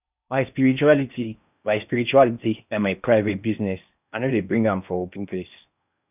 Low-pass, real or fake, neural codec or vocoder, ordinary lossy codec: 3.6 kHz; fake; codec, 16 kHz in and 24 kHz out, 0.6 kbps, FocalCodec, streaming, 4096 codes; none